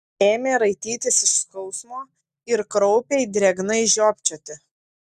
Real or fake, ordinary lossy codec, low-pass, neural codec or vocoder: real; Opus, 64 kbps; 14.4 kHz; none